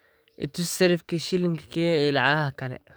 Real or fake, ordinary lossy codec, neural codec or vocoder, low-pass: fake; none; codec, 44.1 kHz, 7.8 kbps, DAC; none